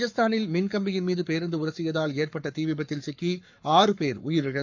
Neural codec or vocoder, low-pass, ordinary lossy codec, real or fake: codec, 44.1 kHz, 7.8 kbps, Pupu-Codec; 7.2 kHz; none; fake